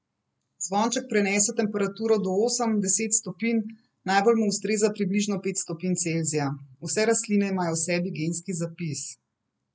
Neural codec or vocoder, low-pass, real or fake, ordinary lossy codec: none; none; real; none